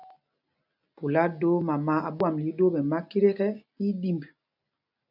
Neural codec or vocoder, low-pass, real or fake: none; 5.4 kHz; real